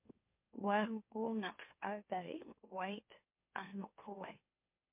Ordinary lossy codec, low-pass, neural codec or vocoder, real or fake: MP3, 32 kbps; 3.6 kHz; autoencoder, 44.1 kHz, a latent of 192 numbers a frame, MeloTTS; fake